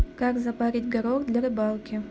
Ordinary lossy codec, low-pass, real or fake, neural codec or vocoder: none; none; real; none